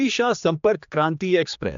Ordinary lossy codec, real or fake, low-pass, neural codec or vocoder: MP3, 64 kbps; fake; 7.2 kHz; codec, 16 kHz, 2 kbps, X-Codec, HuBERT features, trained on general audio